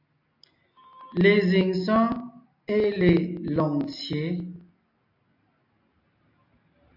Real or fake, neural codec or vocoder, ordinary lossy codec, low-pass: real; none; MP3, 48 kbps; 5.4 kHz